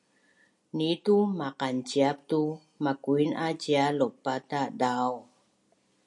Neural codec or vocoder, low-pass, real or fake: none; 10.8 kHz; real